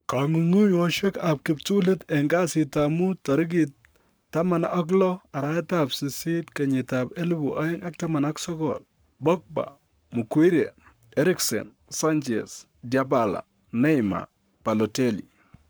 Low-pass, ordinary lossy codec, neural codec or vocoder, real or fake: none; none; codec, 44.1 kHz, 7.8 kbps, Pupu-Codec; fake